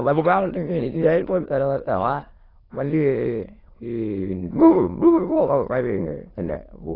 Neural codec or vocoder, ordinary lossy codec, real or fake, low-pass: autoencoder, 22.05 kHz, a latent of 192 numbers a frame, VITS, trained on many speakers; AAC, 24 kbps; fake; 5.4 kHz